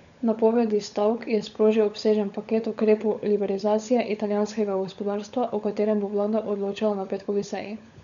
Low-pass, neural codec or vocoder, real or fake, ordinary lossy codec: 7.2 kHz; codec, 16 kHz, 16 kbps, FunCodec, trained on LibriTTS, 50 frames a second; fake; none